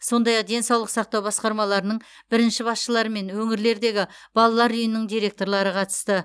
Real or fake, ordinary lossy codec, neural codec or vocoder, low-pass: real; none; none; none